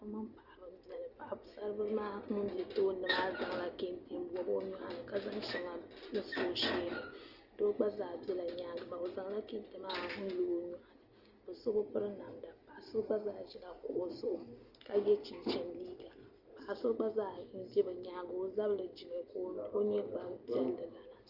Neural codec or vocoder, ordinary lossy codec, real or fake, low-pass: none; Opus, 24 kbps; real; 5.4 kHz